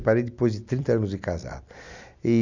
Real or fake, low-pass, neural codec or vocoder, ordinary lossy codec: real; 7.2 kHz; none; none